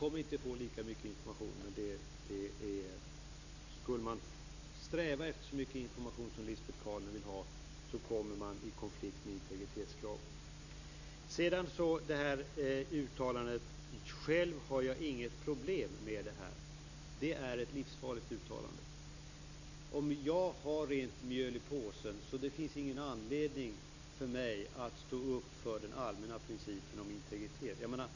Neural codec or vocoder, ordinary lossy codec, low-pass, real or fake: none; none; 7.2 kHz; real